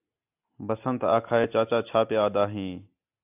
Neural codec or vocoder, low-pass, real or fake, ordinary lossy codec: none; 3.6 kHz; real; AAC, 32 kbps